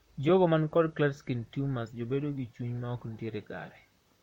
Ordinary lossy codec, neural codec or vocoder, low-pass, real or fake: MP3, 64 kbps; vocoder, 44.1 kHz, 128 mel bands, Pupu-Vocoder; 19.8 kHz; fake